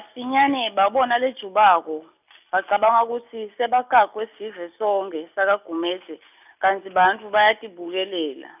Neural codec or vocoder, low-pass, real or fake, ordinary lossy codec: none; 3.6 kHz; real; none